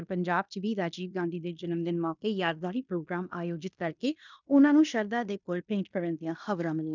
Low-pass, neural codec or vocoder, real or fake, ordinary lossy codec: 7.2 kHz; codec, 16 kHz in and 24 kHz out, 0.9 kbps, LongCat-Audio-Codec, fine tuned four codebook decoder; fake; none